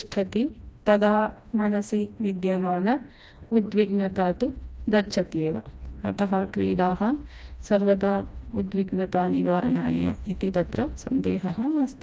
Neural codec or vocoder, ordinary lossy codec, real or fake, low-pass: codec, 16 kHz, 1 kbps, FreqCodec, smaller model; none; fake; none